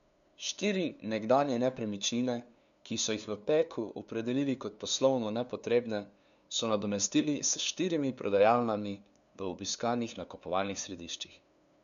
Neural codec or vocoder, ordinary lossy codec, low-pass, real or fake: codec, 16 kHz, 2 kbps, FunCodec, trained on LibriTTS, 25 frames a second; none; 7.2 kHz; fake